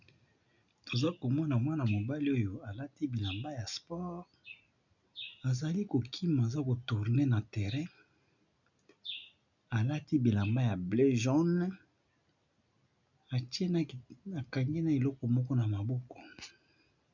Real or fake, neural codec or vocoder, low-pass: real; none; 7.2 kHz